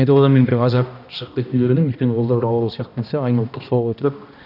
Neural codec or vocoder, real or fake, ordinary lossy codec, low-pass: codec, 16 kHz, 1 kbps, X-Codec, HuBERT features, trained on balanced general audio; fake; none; 5.4 kHz